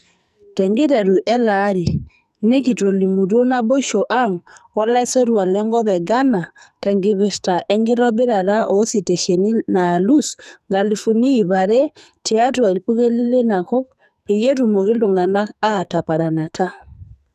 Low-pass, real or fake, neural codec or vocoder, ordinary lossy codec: 14.4 kHz; fake; codec, 44.1 kHz, 2.6 kbps, SNAC; none